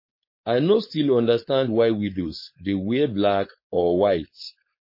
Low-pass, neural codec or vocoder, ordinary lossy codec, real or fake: 5.4 kHz; codec, 16 kHz, 4.8 kbps, FACodec; MP3, 24 kbps; fake